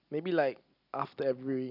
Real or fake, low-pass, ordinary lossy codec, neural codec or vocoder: real; 5.4 kHz; none; none